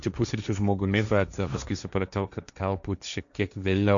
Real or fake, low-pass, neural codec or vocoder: fake; 7.2 kHz; codec, 16 kHz, 1.1 kbps, Voila-Tokenizer